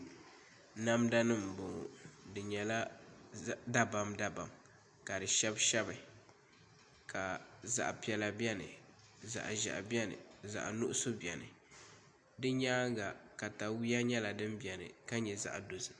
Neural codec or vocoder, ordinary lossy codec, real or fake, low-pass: none; MP3, 64 kbps; real; 9.9 kHz